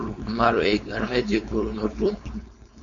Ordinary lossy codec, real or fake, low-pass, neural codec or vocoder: AAC, 64 kbps; fake; 7.2 kHz; codec, 16 kHz, 4.8 kbps, FACodec